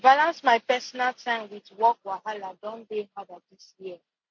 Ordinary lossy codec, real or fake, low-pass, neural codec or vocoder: MP3, 48 kbps; real; 7.2 kHz; none